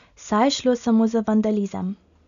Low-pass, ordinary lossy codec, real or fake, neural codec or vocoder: 7.2 kHz; none; real; none